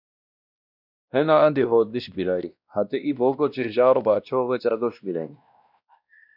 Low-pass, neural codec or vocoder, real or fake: 5.4 kHz; codec, 16 kHz, 1 kbps, X-Codec, WavLM features, trained on Multilingual LibriSpeech; fake